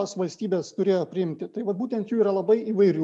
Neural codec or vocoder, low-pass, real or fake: none; 10.8 kHz; real